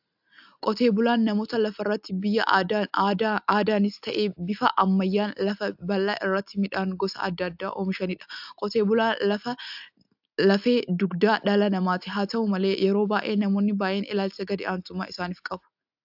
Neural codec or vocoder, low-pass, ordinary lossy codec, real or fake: none; 5.4 kHz; AAC, 48 kbps; real